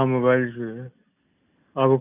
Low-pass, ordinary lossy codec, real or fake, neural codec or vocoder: 3.6 kHz; none; real; none